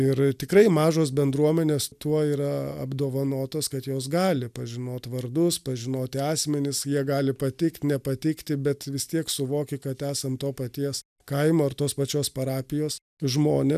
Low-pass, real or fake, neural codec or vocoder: 14.4 kHz; real; none